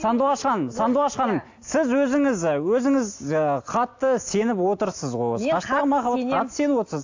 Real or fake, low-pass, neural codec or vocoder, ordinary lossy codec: real; 7.2 kHz; none; AAC, 48 kbps